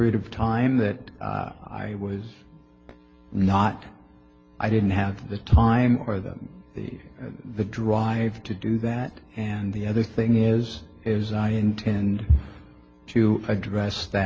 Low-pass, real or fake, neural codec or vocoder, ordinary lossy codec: 7.2 kHz; real; none; Opus, 24 kbps